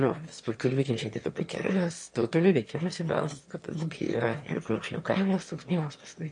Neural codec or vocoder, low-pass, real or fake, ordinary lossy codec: autoencoder, 22.05 kHz, a latent of 192 numbers a frame, VITS, trained on one speaker; 9.9 kHz; fake; MP3, 48 kbps